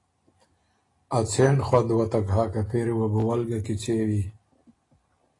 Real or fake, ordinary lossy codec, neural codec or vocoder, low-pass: real; AAC, 32 kbps; none; 10.8 kHz